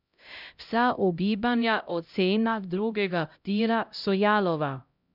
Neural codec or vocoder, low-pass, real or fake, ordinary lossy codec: codec, 16 kHz, 0.5 kbps, X-Codec, HuBERT features, trained on LibriSpeech; 5.4 kHz; fake; none